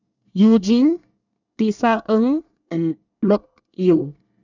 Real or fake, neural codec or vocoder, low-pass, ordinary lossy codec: fake; codec, 24 kHz, 1 kbps, SNAC; 7.2 kHz; none